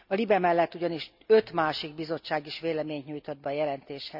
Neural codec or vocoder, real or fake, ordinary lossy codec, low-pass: none; real; none; 5.4 kHz